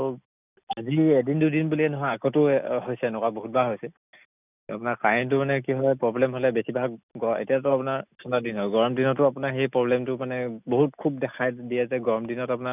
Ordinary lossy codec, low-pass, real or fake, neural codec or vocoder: none; 3.6 kHz; real; none